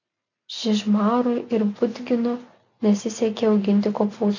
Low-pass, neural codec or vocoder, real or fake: 7.2 kHz; none; real